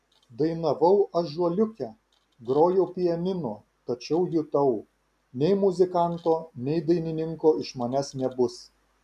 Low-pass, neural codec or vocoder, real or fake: 14.4 kHz; none; real